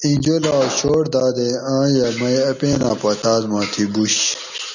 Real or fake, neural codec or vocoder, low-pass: real; none; 7.2 kHz